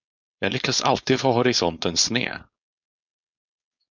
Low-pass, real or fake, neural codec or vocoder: 7.2 kHz; fake; codec, 16 kHz, 4.8 kbps, FACodec